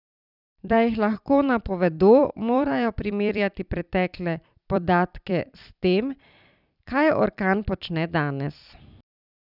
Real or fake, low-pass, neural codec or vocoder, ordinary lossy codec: fake; 5.4 kHz; vocoder, 44.1 kHz, 128 mel bands every 256 samples, BigVGAN v2; none